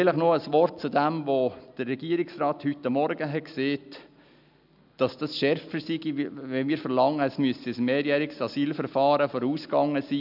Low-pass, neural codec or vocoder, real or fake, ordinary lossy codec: 5.4 kHz; none; real; none